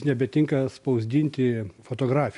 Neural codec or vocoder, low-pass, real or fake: none; 10.8 kHz; real